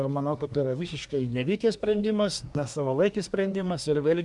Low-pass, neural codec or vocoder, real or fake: 10.8 kHz; codec, 24 kHz, 1 kbps, SNAC; fake